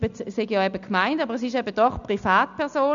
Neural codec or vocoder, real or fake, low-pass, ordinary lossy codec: none; real; 7.2 kHz; none